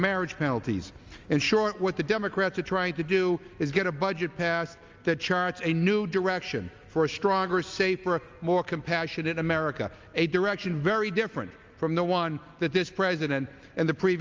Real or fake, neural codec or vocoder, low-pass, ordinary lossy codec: real; none; 7.2 kHz; Opus, 32 kbps